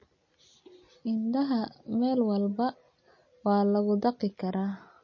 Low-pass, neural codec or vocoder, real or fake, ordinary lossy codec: 7.2 kHz; none; real; MP3, 32 kbps